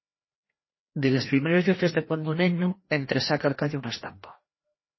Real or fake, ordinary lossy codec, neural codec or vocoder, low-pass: fake; MP3, 24 kbps; codec, 16 kHz, 1 kbps, FreqCodec, larger model; 7.2 kHz